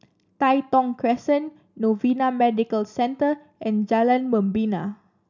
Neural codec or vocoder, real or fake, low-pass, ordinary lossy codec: none; real; 7.2 kHz; none